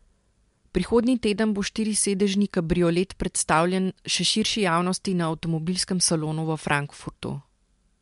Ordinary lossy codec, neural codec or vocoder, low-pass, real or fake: MP3, 64 kbps; none; 10.8 kHz; real